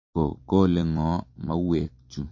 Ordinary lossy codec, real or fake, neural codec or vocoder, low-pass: MP3, 32 kbps; fake; vocoder, 44.1 kHz, 128 mel bands every 256 samples, BigVGAN v2; 7.2 kHz